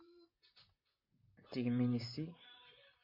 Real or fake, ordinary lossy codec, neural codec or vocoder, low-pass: fake; MP3, 32 kbps; vocoder, 44.1 kHz, 128 mel bands, Pupu-Vocoder; 5.4 kHz